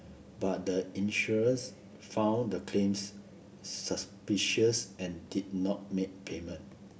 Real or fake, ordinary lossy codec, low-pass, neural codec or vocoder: real; none; none; none